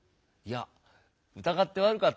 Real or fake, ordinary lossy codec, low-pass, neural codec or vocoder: real; none; none; none